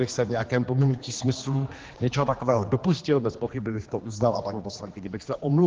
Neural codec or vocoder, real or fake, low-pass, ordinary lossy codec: codec, 16 kHz, 2 kbps, X-Codec, HuBERT features, trained on general audio; fake; 7.2 kHz; Opus, 16 kbps